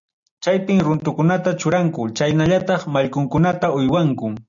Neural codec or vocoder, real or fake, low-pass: none; real; 7.2 kHz